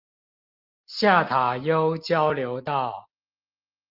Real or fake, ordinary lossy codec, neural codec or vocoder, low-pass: real; Opus, 32 kbps; none; 5.4 kHz